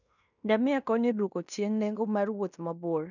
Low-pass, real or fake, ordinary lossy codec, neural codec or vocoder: 7.2 kHz; fake; none; codec, 16 kHz in and 24 kHz out, 0.9 kbps, LongCat-Audio-Codec, fine tuned four codebook decoder